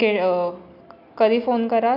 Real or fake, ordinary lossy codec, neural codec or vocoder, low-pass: real; none; none; 5.4 kHz